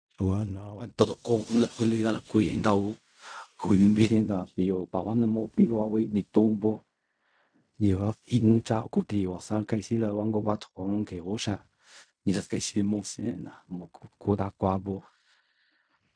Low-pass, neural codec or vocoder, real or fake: 9.9 kHz; codec, 16 kHz in and 24 kHz out, 0.4 kbps, LongCat-Audio-Codec, fine tuned four codebook decoder; fake